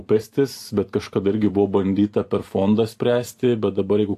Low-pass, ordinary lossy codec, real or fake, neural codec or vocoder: 14.4 kHz; AAC, 64 kbps; fake; vocoder, 44.1 kHz, 128 mel bands every 512 samples, BigVGAN v2